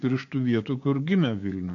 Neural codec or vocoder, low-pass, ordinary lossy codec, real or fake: codec, 16 kHz, 6 kbps, DAC; 7.2 kHz; AAC, 48 kbps; fake